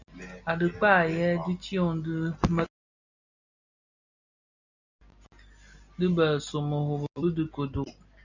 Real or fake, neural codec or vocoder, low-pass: real; none; 7.2 kHz